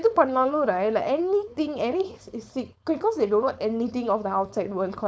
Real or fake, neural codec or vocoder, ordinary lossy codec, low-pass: fake; codec, 16 kHz, 4.8 kbps, FACodec; none; none